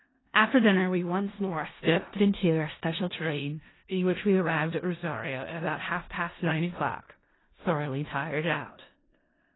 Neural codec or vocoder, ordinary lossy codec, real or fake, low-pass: codec, 16 kHz in and 24 kHz out, 0.4 kbps, LongCat-Audio-Codec, four codebook decoder; AAC, 16 kbps; fake; 7.2 kHz